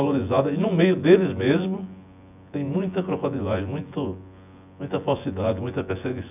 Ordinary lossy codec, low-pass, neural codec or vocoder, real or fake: none; 3.6 kHz; vocoder, 24 kHz, 100 mel bands, Vocos; fake